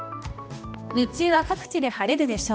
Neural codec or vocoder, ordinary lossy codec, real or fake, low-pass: codec, 16 kHz, 1 kbps, X-Codec, HuBERT features, trained on balanced general audio; none; fake; none